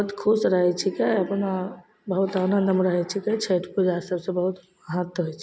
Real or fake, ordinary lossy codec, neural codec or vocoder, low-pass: real; none; none; none